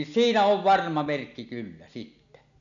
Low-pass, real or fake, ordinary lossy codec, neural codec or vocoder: 7.2 kHz; real; none; none